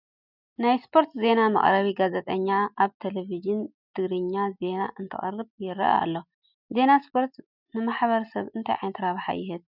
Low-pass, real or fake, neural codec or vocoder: 5.4 kHz; real; none